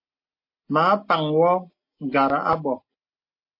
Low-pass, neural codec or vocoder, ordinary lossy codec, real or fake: 5.4 kHz; none; MP3, 32 kbps; real